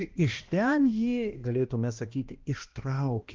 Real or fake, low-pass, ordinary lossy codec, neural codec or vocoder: fake; 7.2 kHz; Opus, 32 kbps; codec, 16 kHz, 1 kbps, X-Codec, HuBERT features, trained on balanced general audio